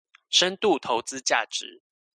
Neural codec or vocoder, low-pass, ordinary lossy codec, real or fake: none; 9.9 kHz; MP3, 96 kbps; real